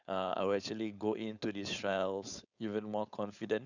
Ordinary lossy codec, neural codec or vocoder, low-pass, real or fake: none; codec, 16 kHz, 4.8 kbps, FACodec; 7.2 kHz; fake